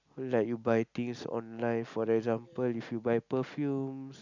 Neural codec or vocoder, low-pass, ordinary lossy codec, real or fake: none; 7.2 kHz; none; real